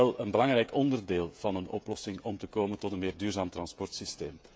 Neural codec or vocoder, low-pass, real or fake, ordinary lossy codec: codec, 16 kHz, 16 kbps, FreqCodec, smaller model; none; fake; none